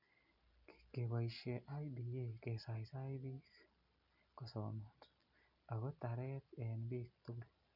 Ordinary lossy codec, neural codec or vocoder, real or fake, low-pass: none; none; real; 5.4 kHz